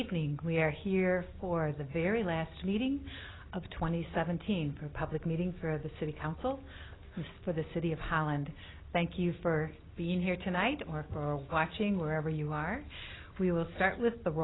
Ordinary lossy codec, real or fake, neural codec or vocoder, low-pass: AAC, 16 kbps; real; none; 7.2 kHz